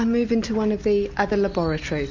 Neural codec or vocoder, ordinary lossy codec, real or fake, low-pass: none; MP3, 48 kbps; real; 7.2 kHz